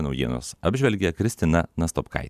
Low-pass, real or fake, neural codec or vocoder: 14.4 kHz; fake; vocoder, 44.1 kHz, 128 mel bands every 512 samples, BigVGAN v2